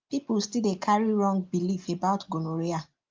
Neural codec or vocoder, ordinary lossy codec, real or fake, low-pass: none; Opus, 24 kbps; real; 7.2 kHz